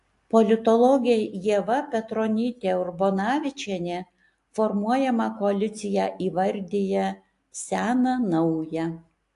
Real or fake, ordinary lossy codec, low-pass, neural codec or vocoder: real; AAC, 64 kbps; 10.8 kHz; none